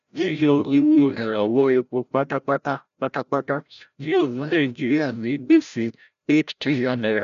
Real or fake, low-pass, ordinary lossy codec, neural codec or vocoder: fake; 7.2 kHz; MP3, 96 kbps; codec, 16 kHz, 0.5 kbps, FreqCodec, larger model